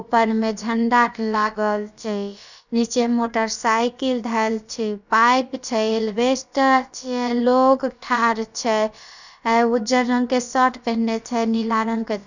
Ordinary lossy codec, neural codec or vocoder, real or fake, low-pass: none; codec, 16 kHz, about 1 kbps, DyCAST, with the encoder's durations; fake; 7.2 kHz